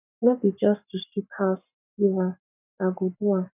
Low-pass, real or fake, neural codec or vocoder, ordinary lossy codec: 3.6 kHz; fake; codec, 44.1 kHz, 7.8 kbps, Pupu-Codec; none